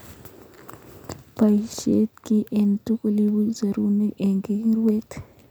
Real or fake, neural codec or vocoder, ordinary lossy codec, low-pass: real; none; none; none